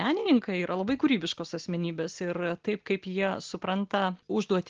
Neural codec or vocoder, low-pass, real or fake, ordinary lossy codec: none; 7.2 kHz; real; Opus, 16 kbps